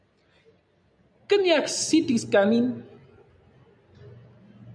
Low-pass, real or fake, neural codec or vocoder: 9.9 kHz; real; none